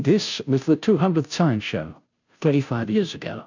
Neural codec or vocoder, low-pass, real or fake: codec, 16 kHz, 0.5 kbps, FunCodec, trained on Chinese and English, 25 frames a second; 7.2 kHz; fake